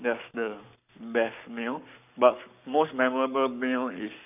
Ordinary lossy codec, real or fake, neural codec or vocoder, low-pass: none; fake; codec, 44.1 kHz, 7.8 kbps, Pupu-Codec; 3.6 kHz